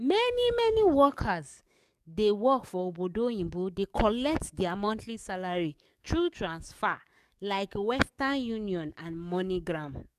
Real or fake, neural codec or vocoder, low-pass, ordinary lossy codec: fake; codec, 44.1 kHz, 7.8 kbps, DAC; 14.4 kHz; none